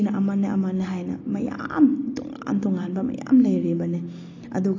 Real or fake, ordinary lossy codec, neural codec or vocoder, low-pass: real; AAC, 32 kbps; none; 7.2 kHz